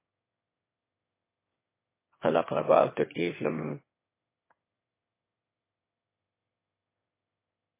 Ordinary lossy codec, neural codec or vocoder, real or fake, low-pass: MP3, 16 kbps; autoencoder, 22.05 kHz, a latent of 192 numbers a frame, VITS, trained on one speaker; fake; 3.6 kHz